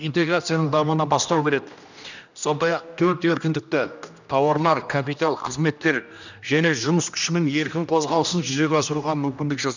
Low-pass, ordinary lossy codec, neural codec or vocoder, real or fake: 7.2 kHz; none; codec, 16 kHz, 1 kbps, X-Codec, HuBERT features, trained on general audio; fake